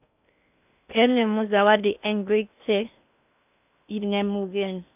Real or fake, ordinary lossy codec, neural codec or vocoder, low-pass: fake; none; codec, 16 kHz in and 24 kHz out, 0.6 kbps, FocalCodec, streaming, 2048 codes; 3.6 kHz